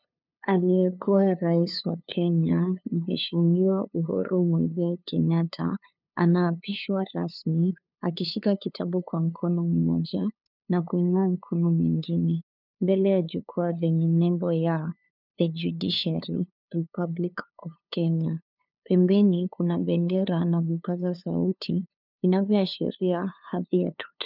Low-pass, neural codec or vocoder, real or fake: 5.4 kHz; codec, 16 kHz, 2 kbps, FunCodec, trained on LibriTTS, 25 frames a second; fake